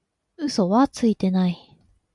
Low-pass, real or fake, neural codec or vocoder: 10.8 kHz; real; none